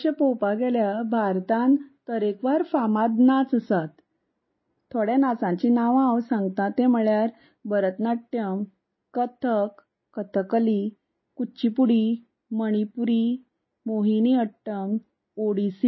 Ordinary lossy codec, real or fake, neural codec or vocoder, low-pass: MP3, 24 kbps; real; none; 7.2 kHz